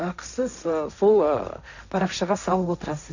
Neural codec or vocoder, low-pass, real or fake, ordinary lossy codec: codec, 16 kHz, 1.1 kbps, Voila-Tokenizer; 7.2 kHz; fake; none